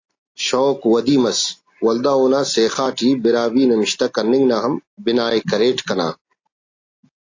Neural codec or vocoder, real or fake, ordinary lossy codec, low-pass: none; real; AAC, 48 kbps; 7.2 kHz